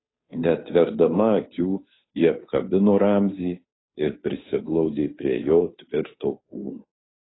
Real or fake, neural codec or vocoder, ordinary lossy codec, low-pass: fake; codec, 16 kHz, 2 kbps, FunCodec, trained on Chinese and English, 25 frames a second; AAC, 16 kbps; 7.2 kHz